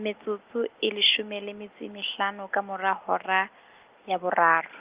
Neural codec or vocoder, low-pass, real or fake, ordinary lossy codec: none; 3.6 kHz; real; Opus, 32 kbps